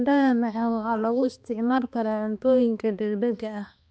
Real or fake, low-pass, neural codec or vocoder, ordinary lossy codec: fake; none; codec, 16 kHz, 2 kbps, X-Codec, HuBERT features, trained on balanced general audio; none